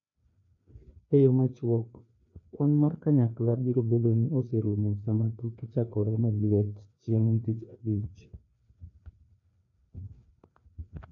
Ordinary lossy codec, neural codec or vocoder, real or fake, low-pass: none; codec, 16 kHz, 2 kbps, FreqCodec, larger model; fake; 7.2 kHz